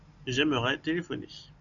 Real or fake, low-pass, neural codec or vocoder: real; 7.2 kHz; none